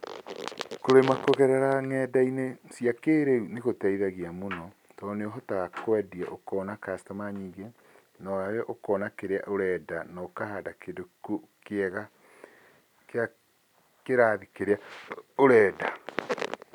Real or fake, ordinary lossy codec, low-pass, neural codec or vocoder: real; none; 19.8 kHz; none